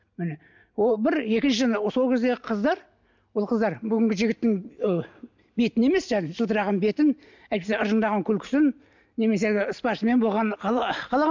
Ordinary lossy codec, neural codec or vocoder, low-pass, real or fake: MP3, 64 kbps; none; 7.2 kHz; real